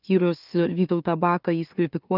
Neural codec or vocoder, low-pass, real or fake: autoencoder, 44.1 kHz, a latent of 192 numbers a frame, MeloTTS; 5.4 kHz; fake